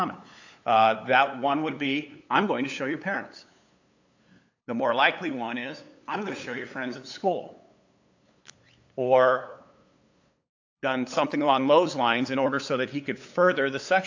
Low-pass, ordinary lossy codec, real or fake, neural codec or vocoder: 7.2 kHz; AAC, 48 kbps; fake; codec, 16 kHz, 8 kbps, FunCodec, trained on LibriTTS, 25 frames a second